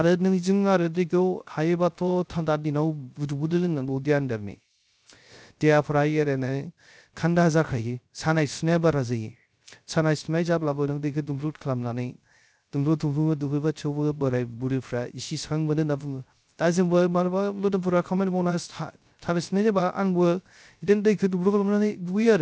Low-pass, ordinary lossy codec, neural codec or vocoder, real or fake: none; none; codec, 16 kHz, 0.3 kbps, FocalCodec; fake